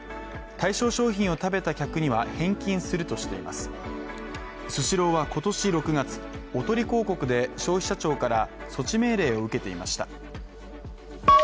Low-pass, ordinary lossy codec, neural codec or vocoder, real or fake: none; none; none; real